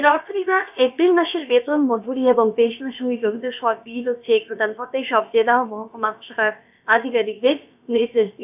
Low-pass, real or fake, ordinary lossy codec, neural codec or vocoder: 3.6 kHz; fake; none; codec, 16 kHz, about 1 kbps, DyCAST, with the encoder's durations